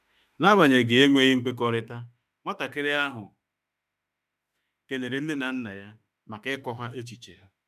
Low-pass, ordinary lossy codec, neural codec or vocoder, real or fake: 14.4 kHz; none; autoencoder, 48 kHz, 32 numbers a frame, DAC-VAE, trained on Japanese speech; fake